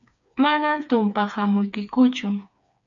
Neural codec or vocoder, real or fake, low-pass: codec, 16 kHz, 4 kbps, FreqCodec, smaller model; fake; 7.2 kHz